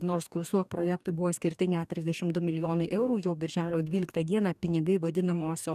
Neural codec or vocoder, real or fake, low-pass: codec, 44.1 kHz, 2.6 kbps, DAC; fake; 14.4 kHz